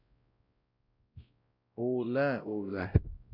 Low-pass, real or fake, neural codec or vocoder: 5.4 kHz; fake; codec, 16 kHz, 0.5 kbps, X-Codec, WavLM features, trained on Multilingual LibriSpeech